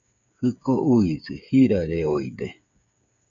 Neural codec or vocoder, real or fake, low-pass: codec, 16 kHz, 8 kbps, FreqCodec, smaller model; fake; 7.2 kHz